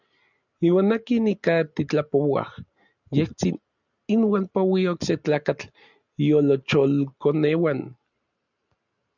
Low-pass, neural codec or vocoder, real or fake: 7.2 kHz; none; real